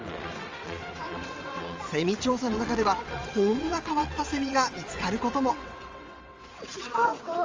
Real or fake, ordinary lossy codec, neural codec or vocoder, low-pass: fake; Opus, 32 kbps; vocoder, 22.05 kHz, 80 mel bands, WaveNeXt; 7.2 kHz